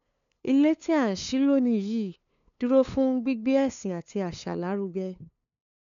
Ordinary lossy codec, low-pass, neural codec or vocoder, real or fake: none; 7.2 kHz; codec, 16 kHz, 2 kbps, FunCodec, trained on LibriTTS, 25 frames a second; fake